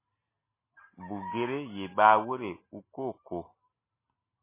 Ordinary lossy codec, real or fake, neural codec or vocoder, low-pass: MP3, 16 kbps; real; none; 3.6 kHz